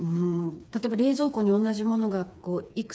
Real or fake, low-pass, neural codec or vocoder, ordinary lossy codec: fake; none; codec, 16 kHz, 4 kbps, FreqCodec, smaller model; none